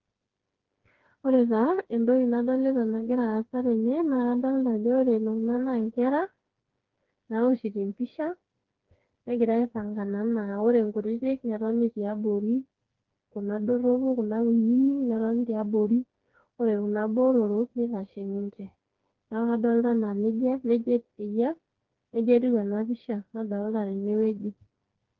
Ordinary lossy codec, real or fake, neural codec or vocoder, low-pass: Opus, 16 kbps; fake; codec, 16 kHz, 4 kbps, FreqCodec, smaller model; 7.2 kHz